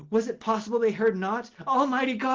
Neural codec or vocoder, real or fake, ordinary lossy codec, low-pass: none; real; Opus, 16 kbps; 7.2 kHz